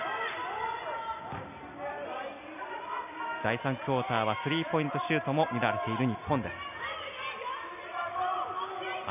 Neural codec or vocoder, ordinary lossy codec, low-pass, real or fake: none; none; 3.6 kHz; real